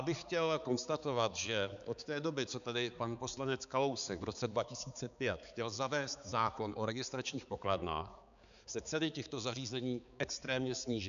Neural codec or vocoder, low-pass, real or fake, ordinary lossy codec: codec, 16 kHz, 4 kbps, X-Codec, HuBERT features, trained on balanced general audio; 7.2 kHz; fake; AAC, 96 kbps